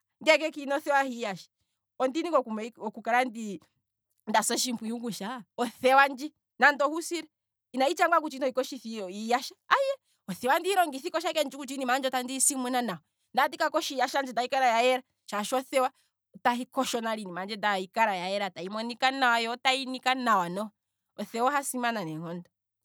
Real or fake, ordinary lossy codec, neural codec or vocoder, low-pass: real; none; none; none